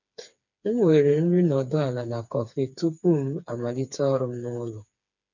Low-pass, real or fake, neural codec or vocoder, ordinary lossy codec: 7.2 kHz; fake; codec, 16 kHz, 4 kbps, FreqCodec, smaller model; none